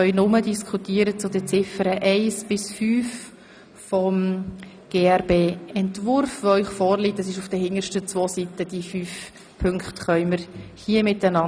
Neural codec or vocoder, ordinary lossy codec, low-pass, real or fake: none; none; none; real